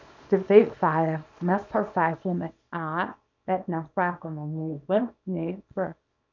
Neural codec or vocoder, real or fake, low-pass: codec, 24 kHz, 0.9 kbps, WavTokenizer, small release; fake; 7.2 kHz